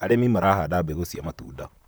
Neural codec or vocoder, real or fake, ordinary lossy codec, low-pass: vocoder, 44.1 kHz, 128 mel bands, Pupu-Vocoder; fake; none; none